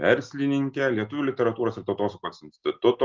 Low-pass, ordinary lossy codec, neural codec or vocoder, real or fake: 7.2 kHz; Opus, 32 kbps; none; real